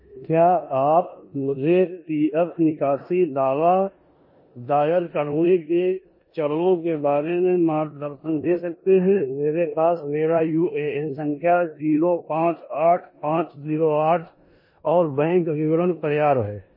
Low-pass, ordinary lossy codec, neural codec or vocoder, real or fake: 5.4 kHz; MP3, 24 kbps; codec, 16 kHz in and 24 kHz out, 0.9 kbps, LongCat-Audio-Codec, four codebook decoder; fake